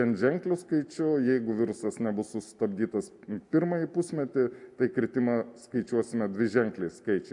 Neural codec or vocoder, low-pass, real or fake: none; 10.8 kHz; real